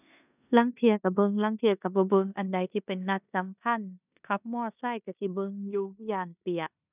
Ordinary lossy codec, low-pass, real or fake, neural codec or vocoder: none; 3.6 kHz; fake; codec, 16 kHz in and 24 kHz out, 0.9 kbps, LongCat-Audio-Codec, four codebook decoder